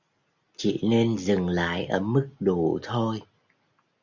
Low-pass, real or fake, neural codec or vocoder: 7.2 kHz; real; none